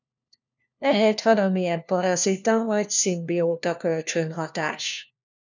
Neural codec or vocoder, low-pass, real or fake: codec, 16 kHz, 1 kbps, FunCodec, trained on LibriTTS, 50 frames a second; 7.2 kHz; fake